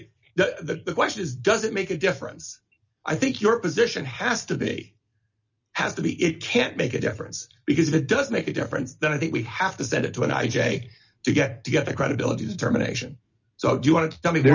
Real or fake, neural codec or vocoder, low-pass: real; none; 7.2 kHz